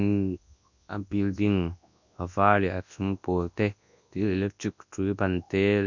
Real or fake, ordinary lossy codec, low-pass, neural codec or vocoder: fake; none; 7.2 kHz; codec, 24 kHz, 0.9 kbps, WavTokenizer, large speech release